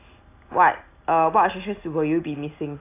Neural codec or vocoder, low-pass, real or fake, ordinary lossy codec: none; 3.6 kHz; real; AAC, 24 kbps